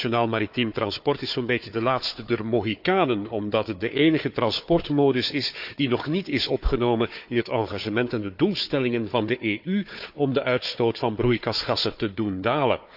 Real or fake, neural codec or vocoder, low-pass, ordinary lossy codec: fake; codec, 16 kHz, 4 kbps, FunCodec, trained on Chinese and English, 50 frames a second; 5.4 kHz; AAC, 48 kbps